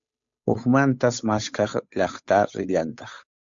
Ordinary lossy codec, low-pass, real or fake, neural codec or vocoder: MP3, 64 kbps; 7.2 kHz; fake; codec, 16 kHz, 8 kbps, FunCodec, trained on Chinese and English, 25 frames a second